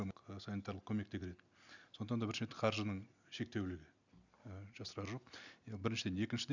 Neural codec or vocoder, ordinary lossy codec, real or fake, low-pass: none; none; real; 7.2 kHz